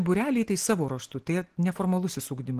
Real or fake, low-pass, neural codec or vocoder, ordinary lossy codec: real; 14.4 kHz; none; Opus, 16 kbps